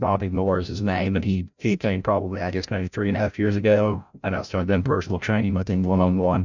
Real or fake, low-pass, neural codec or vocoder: fake; 7.2 kHz; codec, 16 kHz, 0.5 kbps, FreqCodec, larger model